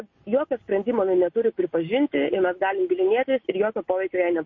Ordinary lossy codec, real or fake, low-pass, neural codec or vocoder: MP3, 32 kbps; real; 7.2 kHz; none